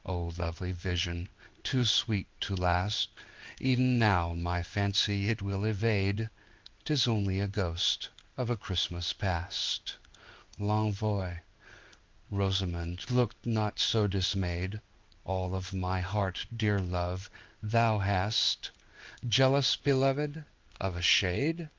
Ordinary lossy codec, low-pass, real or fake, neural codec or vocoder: Opus, 32 kbps; 7.2 kHz; fake; codec, 16 kHz in and 24 kHz out, 1 kbps, XY-Tokenizer